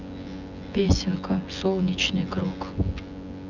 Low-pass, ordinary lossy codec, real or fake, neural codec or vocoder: 7.2 kHz; none; fake; vocoder, 24 kHz, 100 mel bands, Vocos